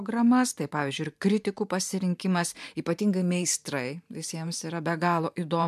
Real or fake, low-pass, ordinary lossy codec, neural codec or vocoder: fake; 14.4 kHz; MP3, 96 kbps; vocoder, 44.1 kHz, 128 mel bands every 512 samples, BigVGAN v2